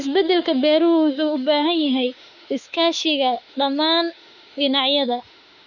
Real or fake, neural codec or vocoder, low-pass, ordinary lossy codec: fake; autoencoder, 48 kHz, 32 numbers a frame, DAC-VAE, trained on Japanese speech; 7.2 kHz; none